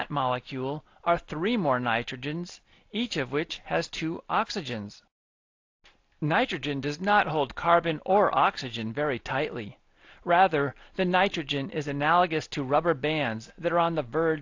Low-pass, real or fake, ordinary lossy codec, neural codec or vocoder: 7.2 kHz; real; AAC, 48 kbps; none